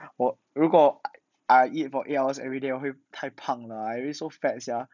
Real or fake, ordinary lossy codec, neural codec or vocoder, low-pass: real; none; none; 7.2 kHz